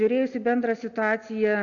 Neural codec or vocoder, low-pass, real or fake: none; 7.2 kHz; real